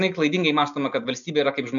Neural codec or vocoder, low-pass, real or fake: none; 7.2 kHz; real